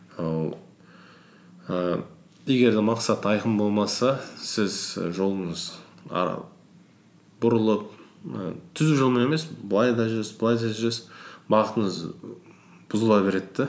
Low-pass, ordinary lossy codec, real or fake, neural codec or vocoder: none; none; real; none